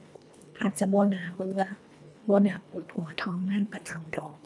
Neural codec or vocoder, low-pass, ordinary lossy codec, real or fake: codec, 24 kHz, 1.5 kbps, HILCodec; none; none; fake